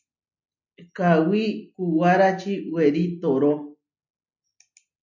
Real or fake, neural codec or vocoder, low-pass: real; none; 7.2 kHz